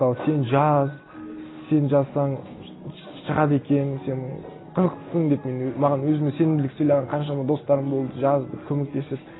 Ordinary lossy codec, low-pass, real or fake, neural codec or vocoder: AAC, 16 kbps; 7.2 kHz; real; none